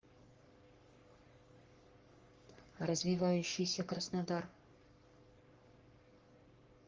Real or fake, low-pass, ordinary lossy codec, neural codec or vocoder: fake; 7.2 kHz; Opus, 32 kbps; codec, 44.1 kHz, 3.4 kbps, Pupu-Codec